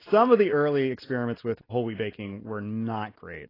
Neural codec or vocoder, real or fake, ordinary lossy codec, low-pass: none; real; AAC, 24 kbps; 5.4 kHz